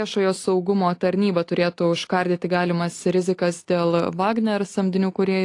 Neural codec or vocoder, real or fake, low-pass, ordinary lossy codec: none; real; 10.8 kHz; AAC, 48 kbps